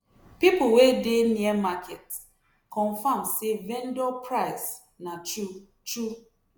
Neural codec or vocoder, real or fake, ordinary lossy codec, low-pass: none; real; none; none